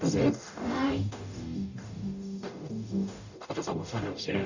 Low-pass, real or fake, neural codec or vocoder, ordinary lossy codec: 7.2 kHz; fake; codec, 44.1 kHz, 0.9 kbps, DAC; none